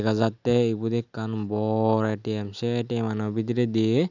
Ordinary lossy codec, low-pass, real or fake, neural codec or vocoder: none; 7.2 kHz; real; none